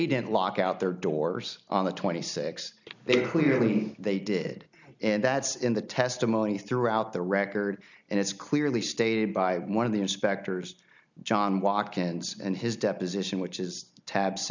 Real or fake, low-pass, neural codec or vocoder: real; 7.2 kHz; none